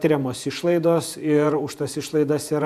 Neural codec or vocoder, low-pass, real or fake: vocoder, 48 kHz, 128 mel bands, Vocos; 14.4 kHz; fake